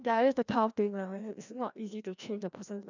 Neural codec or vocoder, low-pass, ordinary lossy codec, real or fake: codec, 16 kHz, 1 kbps, FreqCodec, larger model; 7.2 kHz; none; fake